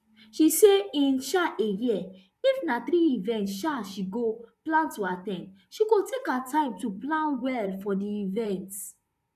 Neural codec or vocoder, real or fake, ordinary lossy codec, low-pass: vocoder, 44.1 kHz, 128 mel bands, Pupu-Vocoder; fake; none; 14.4 kHz